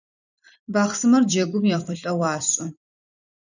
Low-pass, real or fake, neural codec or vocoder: 7.2 kHz; fake; vocoder, 44.1 kHz, 128 mel bands every 256 samples, BigVGAN v2